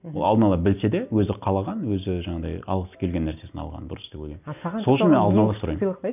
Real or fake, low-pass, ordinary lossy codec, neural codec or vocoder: real; 3.6 kHz; none; none